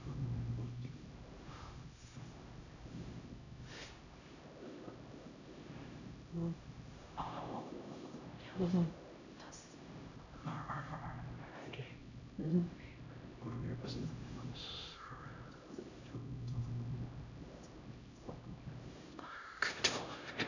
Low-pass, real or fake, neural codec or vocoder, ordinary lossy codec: 7.2 kHz; fake; codec, 16 kHz, 0.5 kbps, X-Codec, HuBERT features, trained on LibriSpeech; none